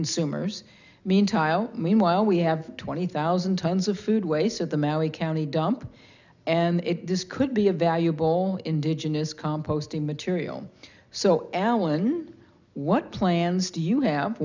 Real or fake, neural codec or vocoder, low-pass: real; none; 7.2 kHz